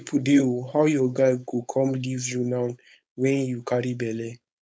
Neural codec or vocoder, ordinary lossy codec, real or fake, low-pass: codec, 16 kHz, 4.8 kbps, FACodec; none; fake; none